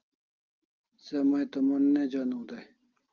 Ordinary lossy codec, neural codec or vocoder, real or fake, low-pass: Opus, 24 kbps; none; real; 7.2 kHz